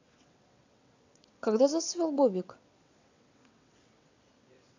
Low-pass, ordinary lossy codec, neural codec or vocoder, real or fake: 7.2 kHz; AAC, 48 kbps; vocoder, 22.05 kHz, 80 mel bands, WaveNeXt; fake